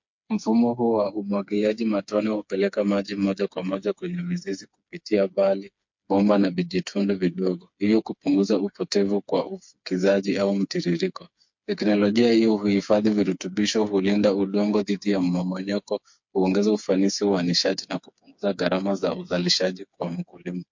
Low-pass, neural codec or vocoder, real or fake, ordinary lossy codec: 7.2 kHz; codec, 16 kHz, 4 kbps, FreqCodec, smaller model; fake; MP3, 48 kbps